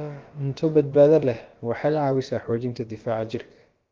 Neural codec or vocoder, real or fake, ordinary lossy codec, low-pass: codec, 16 kHz, about 1 kbps, DyCAST, with the encoder's durations; fake; Opus, 32 kbps; 7.2 kHz